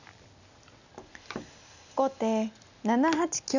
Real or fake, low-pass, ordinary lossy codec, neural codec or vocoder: real; 7.2 kHz; none; none